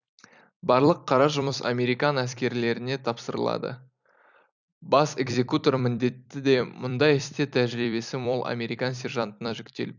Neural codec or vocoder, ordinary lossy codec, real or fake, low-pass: vocoder, 44.1 kHz, 128 mel bands every 256 samples, BigVGAN v2; none; fake; 7.2 kHz